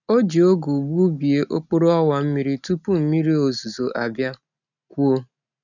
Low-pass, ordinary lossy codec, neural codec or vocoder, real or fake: 7.2 kHz; none; none; real